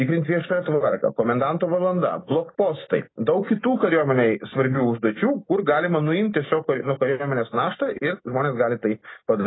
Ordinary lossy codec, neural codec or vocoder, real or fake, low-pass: AAC, 16 kbps; none; real; 7.2 kHz